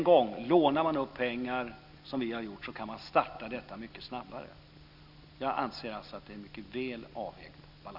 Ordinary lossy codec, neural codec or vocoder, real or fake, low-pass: none; none; real; 5.4 kHz